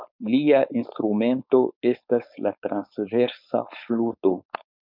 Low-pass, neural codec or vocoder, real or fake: 5.4 kHz; codec, 16 kHz, 4.8 kbps, FACodec; fake